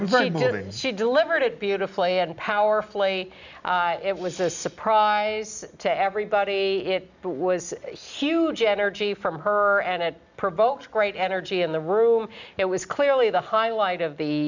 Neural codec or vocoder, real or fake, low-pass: none; real; 7.2 kHz